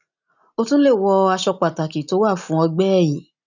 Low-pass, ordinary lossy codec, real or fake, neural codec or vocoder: 7.2 kHz; none; real; none